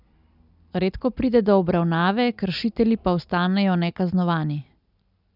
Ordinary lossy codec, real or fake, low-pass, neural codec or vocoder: none; real; 5.4 kHz; none